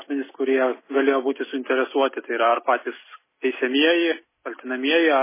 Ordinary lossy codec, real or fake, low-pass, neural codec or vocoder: MP3, 16 kbps; real; 3.6 kHz; none